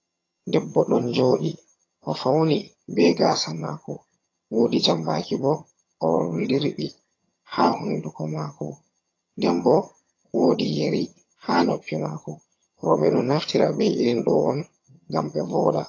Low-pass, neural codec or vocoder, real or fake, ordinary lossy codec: 7.2 kHz; vocoder, 22.05 kHz, 80 mel bands, HiFi-GAN; fake; AAC, 32 kbps